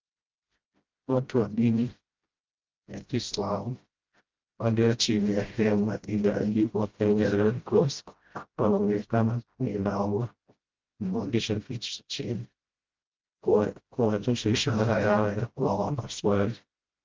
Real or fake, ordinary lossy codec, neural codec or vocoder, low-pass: fake; Opus, 24 kbps; codec, 16 kHz, 0.5 kbps, FreqCodec, smaller model; 7.2 kHz